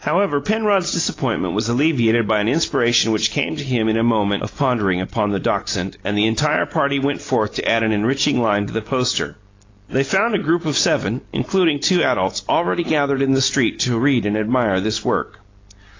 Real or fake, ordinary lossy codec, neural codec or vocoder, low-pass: real; AAC, 32 kbps; none; 7.2 kHz